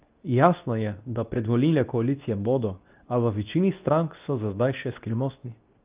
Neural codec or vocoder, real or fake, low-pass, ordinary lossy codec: codec, 24 kHz, 0.9 kbps, WavTokenizer, medium speech release version 2; fake; 3.6 kHz; Opus, 24 kbps